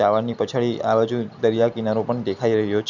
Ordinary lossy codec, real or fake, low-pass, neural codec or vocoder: none; real; 7.2 kHz; none